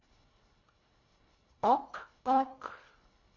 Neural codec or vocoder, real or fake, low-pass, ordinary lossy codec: codec, 24 kHz, 1.5 kbps, HILCodec; fake; 7.2 kHz; MP3, 48 kbps